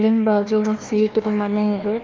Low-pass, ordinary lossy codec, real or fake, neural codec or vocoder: 7.2 kHz; Opus, 32 kbps; fake; codec, 16 kHz, 1 kbps, FunCodec, trained on Chinese and English, 50 frames a second